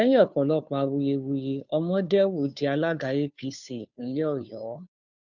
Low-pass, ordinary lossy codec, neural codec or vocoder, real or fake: 7.2 kHz; none; codec, 16 kHz, 2 kbps, FunCodec, trained on Chinese and English, 25 frames a second; fake